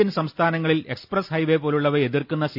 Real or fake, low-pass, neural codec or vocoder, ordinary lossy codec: real; 5.4 kHz; none; AAC, 48 kbps